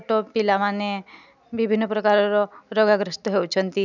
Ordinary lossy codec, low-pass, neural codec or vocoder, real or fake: none; 7.2 kHz; none; real